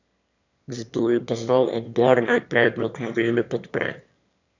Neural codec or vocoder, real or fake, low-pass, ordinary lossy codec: autoencoder, 22.05 kHz, a latent of 192 numbers a frame, VITS, trained on one speaker; fake; 7.2 kHz; none